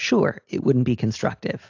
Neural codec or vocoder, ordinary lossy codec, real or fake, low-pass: none; AAC, 48 kbps; real; 7.2 kHz